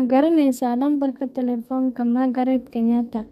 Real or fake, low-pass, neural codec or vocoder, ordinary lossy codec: fake; 14.4 kHz; codec, 32 kHz, 1.9 kbps, SNAC; none